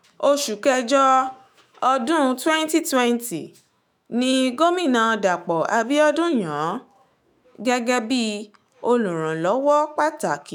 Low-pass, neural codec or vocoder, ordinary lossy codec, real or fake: none; autoencoder, 48 kHz, 128 numbers a frame, DAC-VAE, trained on Japanese speech; none; fake